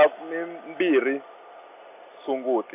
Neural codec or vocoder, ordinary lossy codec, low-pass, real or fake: none; none; 3.6 kHz; real